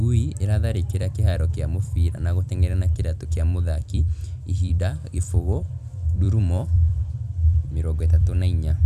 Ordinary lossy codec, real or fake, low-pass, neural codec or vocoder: none; fake; 14.4 kHz; vocoder, 44.1 kHz, 128 mel bands every 256 samples, BigVGAN v2